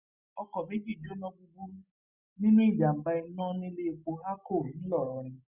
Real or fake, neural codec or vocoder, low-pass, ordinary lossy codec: real; none; 3.6 kHz; Opus, 64 kbps